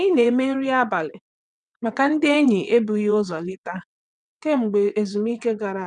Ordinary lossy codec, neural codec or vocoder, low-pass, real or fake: none; vocoder, 22.05 kHz, 80 mel bands, WaveNeXt; 9.9 kHz; fake